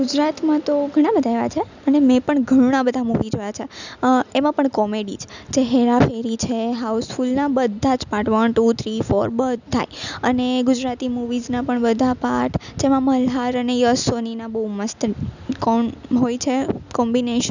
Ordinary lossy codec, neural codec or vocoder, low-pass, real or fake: none; none; 7.2 kHz; real